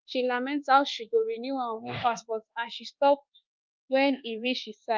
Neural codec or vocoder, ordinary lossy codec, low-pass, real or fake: codec, 24 kHz, 1.2 kbps, DualCodec; Opus, 32 kbps; 7.2 kHz; fake